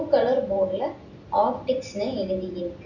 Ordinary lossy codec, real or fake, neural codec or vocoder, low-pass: none; real; none; 7.2 kHz